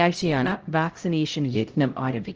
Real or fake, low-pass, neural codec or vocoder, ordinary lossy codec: fake; 7.2 kHz; codec, 16 kHz, 0.5 kbps, X-Codec, HuBERT features, trained on LibriSpeech; Opus, 16 kbps